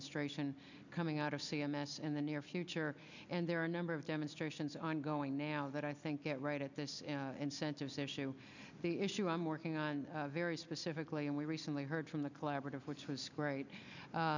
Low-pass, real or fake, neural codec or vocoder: 7.2 kHz; real; none